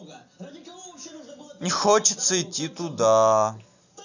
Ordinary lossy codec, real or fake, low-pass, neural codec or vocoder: none; real; 7.2 kHz; none